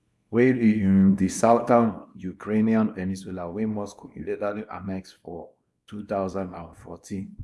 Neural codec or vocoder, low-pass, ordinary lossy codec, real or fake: codec, 24 kHz, 0.9 kbps, WavTokenizer, small release; none; none; fake